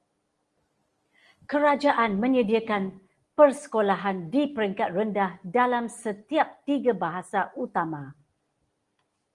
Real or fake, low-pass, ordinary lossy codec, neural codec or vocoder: real; 10.8 kHz; Opus, 24 kbps; none